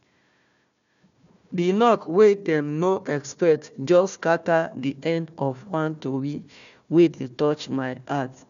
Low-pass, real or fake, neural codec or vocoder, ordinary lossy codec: 7.2 kHz; fake; codec, 16 kHz, 1 kbps, FunCodec, trained on Chinese and English, 50 frames a second; none